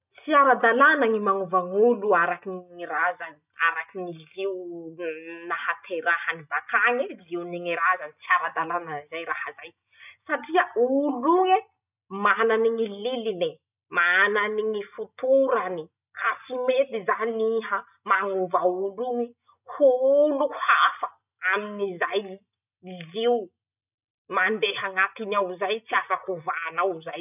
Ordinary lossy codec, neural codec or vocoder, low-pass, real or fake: none; none; 3.6 kHz; real